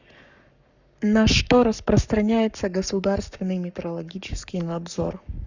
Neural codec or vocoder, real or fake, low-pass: codec, 44.1 kHz, 7.8 kbps, Pupu-Codec; fake; 7.2 kHz